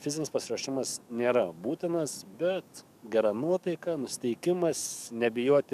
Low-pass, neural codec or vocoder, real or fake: 14.4 kHz; codec, 44.1 kHz, 7.8 kbps, DAC; fake